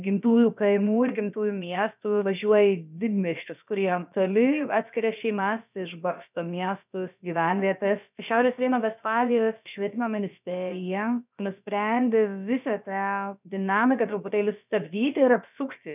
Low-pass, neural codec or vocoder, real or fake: 3.6 kHz; codec, 16 kHz, about 1 kbps, DyCAST, with the encoder's durations; fake